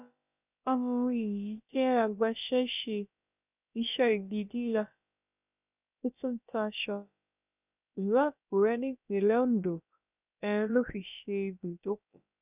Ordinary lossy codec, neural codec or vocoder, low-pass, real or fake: none; codec, 16 kHz, about 1 kbps, DyCAST, with the encoder's durations; 3.6 kHz; fake